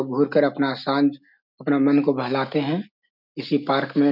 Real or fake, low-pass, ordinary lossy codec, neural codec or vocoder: real; 5.4 kHz; none; none